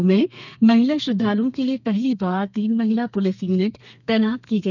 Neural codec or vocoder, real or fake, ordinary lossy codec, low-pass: codec, 32 kHz, 1.9 kbps, SNAC; fake; none; 7.2 kHz